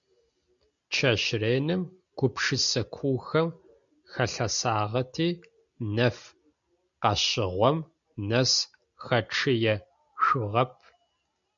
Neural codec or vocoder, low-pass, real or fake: none; 7.2 kHz; real